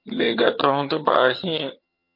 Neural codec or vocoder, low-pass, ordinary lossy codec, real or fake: vocoder, 22.05 kHz, 80 mel bands, HiFi-GAN; 5.4 kHz; MP3, 32 kbps; fake